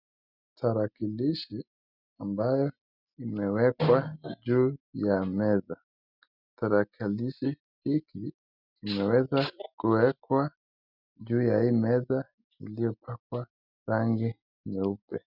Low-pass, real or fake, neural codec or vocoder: 5.4 kHz; real; none